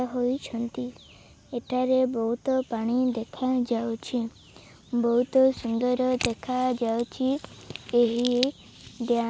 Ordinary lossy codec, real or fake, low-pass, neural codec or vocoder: none; real; none; none